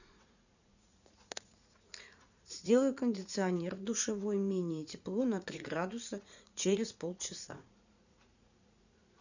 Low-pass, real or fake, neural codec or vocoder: 7.2 kHz; fake; vocoder, 44.1 kHz, 80 mel bands, Vocos